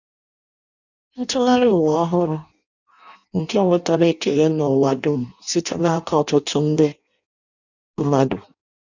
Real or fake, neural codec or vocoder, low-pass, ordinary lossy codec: fake; codec, 16 kHz in and 24 kHz out, 0.6 kbps, FireRedTTS-2 codec; 7.2 kHz; none